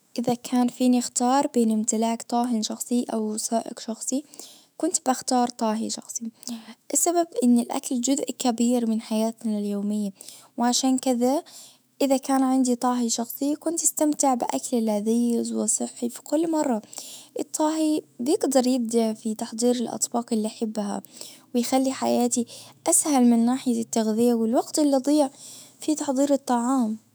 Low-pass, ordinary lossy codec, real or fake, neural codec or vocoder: none; none; fake; autoencoder, 48 kHz, 128 numbers a frame, DAC-VAE, trained on Japanese speech